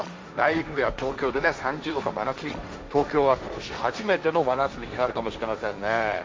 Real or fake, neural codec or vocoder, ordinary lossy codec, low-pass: fake; codec, 16 kHz, 1.1 kbps, Voila-Tokenizer; none; none